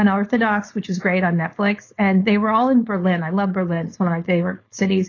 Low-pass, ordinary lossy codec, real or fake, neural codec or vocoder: 7.2 kHz; AAC, 32 kbps; fake; codec, 16 kHz, 4.8 kbps, FACodec